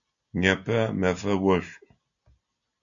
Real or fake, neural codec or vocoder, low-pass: real; none; 7.2 kHz